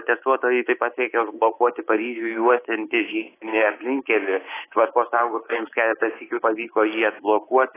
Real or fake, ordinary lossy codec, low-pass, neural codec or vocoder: fake; AAC, 16 kbps; 3.6 kHz; codec, 24 kHz, 3.1 kbps, DualCodec